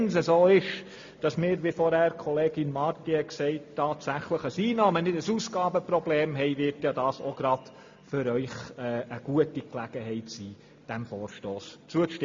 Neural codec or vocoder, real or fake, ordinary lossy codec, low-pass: none; real; MP3, 48 kbps; 7.2 kHz